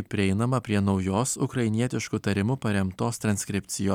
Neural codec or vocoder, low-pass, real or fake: none; 19.8 kHz; real